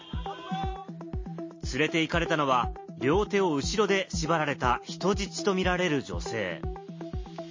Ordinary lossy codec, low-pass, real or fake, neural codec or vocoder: MP3, 32 kbps; 7.2 kHz; real; none